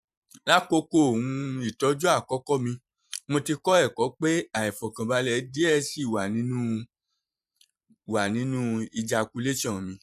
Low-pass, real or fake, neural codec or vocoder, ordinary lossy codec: 14.4 kHz; fake; vocoder, 44.1 kHz, 128 mel bands every 512 samples, BigVGAN v2; none